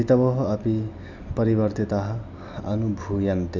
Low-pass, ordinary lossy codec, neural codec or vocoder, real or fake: 7.2 kHz; none; none; real